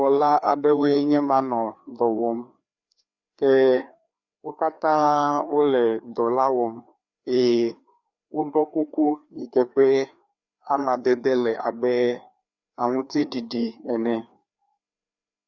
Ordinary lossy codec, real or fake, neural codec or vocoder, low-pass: Opus, 64 kbps; fake; codec, 16 kHz, 2 kbps, FreqCodec, larger model; 7.2 kHz